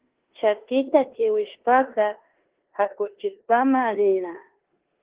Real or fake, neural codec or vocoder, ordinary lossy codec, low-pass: fake; codec, 16 kHz in and 24 kHz out, 0.9 kbps, LongCat-Audio-Codec, four codebook decoder; Opus, 16 kbps; 3.6 kHz